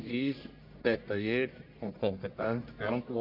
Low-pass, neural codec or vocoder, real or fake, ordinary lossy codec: 5.4 kHz; codec, 44.1 kHz, 1.7 kbps, Pupu-Codec; fake; MP3, 48 kbps